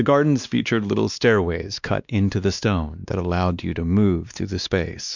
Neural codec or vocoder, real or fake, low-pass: codec, 16 kHz, 2 kbps, X-Codec, WavLM features, trained on Multilingual LibriSpeech; fake; 7.2 kHz